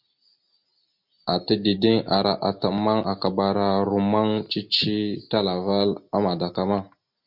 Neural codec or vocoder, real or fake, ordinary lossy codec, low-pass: none; real; MP3, 48 kbps; 5.4 kHz